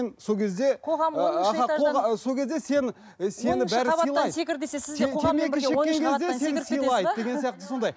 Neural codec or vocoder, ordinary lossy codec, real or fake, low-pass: none; none; real; none